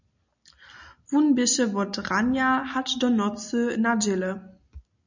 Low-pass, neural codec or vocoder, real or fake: 7.2 kHz; none; real